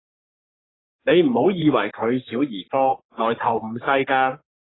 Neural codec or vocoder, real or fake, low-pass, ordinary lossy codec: codec, 44.1 kHz, 3.4 kbps, Pupu-Codec; fake; 7.2 kHz; AAC, 16 kbps